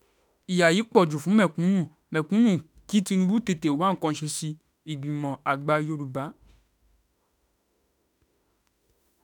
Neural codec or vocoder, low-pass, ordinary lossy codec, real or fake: autoencoder, 48 kHz, 32 numbers a frame, DAC-VAE, trained on Japanese speech; 19.8 kHz; none; fake